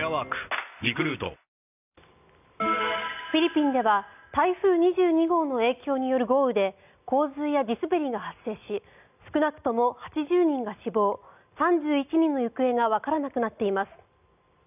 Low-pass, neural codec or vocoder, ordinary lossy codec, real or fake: 3.6 kHz; none; none; real